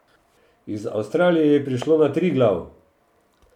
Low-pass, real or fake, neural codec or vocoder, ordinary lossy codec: 19.8 kHz; real; none; none